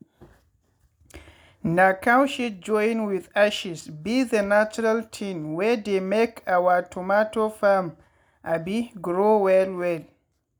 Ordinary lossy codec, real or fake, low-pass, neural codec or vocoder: none; real; none; none